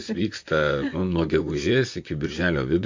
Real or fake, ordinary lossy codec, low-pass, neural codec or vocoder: fake; MP3, 48 kbps; 7.2 kHz; vocoder, 44.1 kHz, 128 mel bands, Pupu-Vocoder